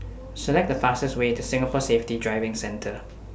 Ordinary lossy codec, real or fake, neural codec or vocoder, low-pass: none; real; none; none